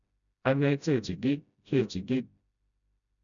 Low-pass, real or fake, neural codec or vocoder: 7.2 kHz; fake; codec, 16 kHz, 0.5 kbps, FreqCodec, smaller model